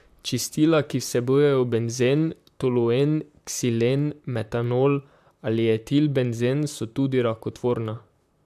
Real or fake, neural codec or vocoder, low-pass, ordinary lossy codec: fake; vocoder, 44.1 kHz, 128 mel bands, Pupu-Vocoder; 14.4 kHz; AAC, 96 kbps